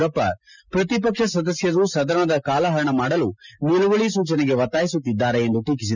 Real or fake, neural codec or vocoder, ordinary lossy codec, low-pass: real; none; none; 7.2 kHz